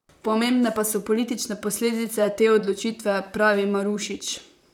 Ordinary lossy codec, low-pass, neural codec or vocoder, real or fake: none; 19.8 kHz; vocoder, 44.1 kHz, 128 mel bands, Pupu-Vocoder; fake